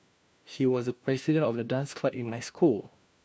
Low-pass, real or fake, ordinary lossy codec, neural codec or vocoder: none; fake; none; codec, 16 kHz, 1 kbps, FunCodec, trained on LibriTTS, 50 frames a second